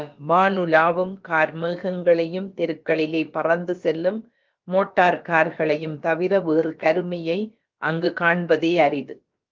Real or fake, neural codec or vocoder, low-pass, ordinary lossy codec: fake; codec, 16 kHz, about 1 kbps, DyCAST, with the encoder's durations; 7.2 kHz; Opus, 32 kbps